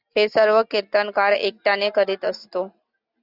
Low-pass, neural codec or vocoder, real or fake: 5.4 kHz; none; real